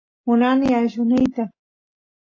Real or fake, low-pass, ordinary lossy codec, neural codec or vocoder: real; 7.2 kHz; AAC, 32 kbps; none